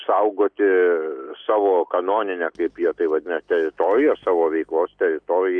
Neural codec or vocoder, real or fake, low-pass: none; real; 9.9 kHz